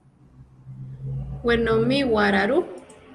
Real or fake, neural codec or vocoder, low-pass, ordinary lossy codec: real; none; 10.8 kHz; Opus, 32 kbps